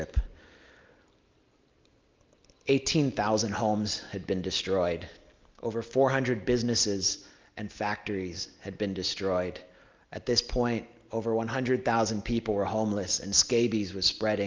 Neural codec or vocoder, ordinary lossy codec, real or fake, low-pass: none; Opus, 32 kbps; real; 7.2 kHz